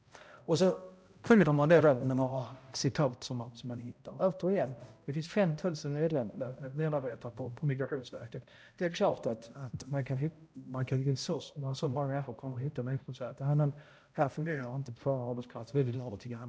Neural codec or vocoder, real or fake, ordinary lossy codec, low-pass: codec, 16 kHz, 0.5 kbps, X-Codec, HuBERT features, trained on balanced general audio; fake; none; none